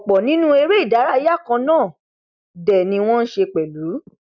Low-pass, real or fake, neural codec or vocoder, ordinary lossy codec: 7.2 kHz; real; none; none